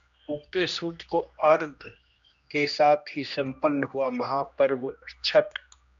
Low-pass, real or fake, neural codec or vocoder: 7.2 kHz; fake; codec, 16 kHz, 1 kbps, X-Codec, HuBERT features, trained on general audio